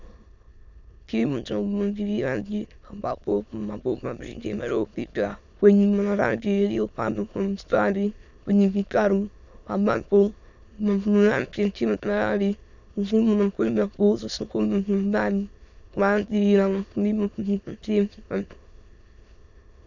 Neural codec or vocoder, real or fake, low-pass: autoencoder, 22.05 kHz, a latent of 192 numbers a frame, VITS, trained on many speakers; fake; 7.2 kHz